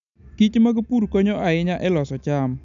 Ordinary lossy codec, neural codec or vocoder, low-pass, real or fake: none; none; 7.2 kHz; real